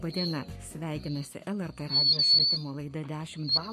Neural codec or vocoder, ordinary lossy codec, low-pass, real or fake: codec, 44.1 kHz, 7.8 kbps, Pupu-Codec; MP3, 64 kbps; 14.4 kHz; fake